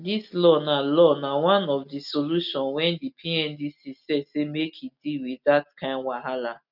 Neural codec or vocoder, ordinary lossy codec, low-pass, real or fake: none; none; 5.4 kHz; real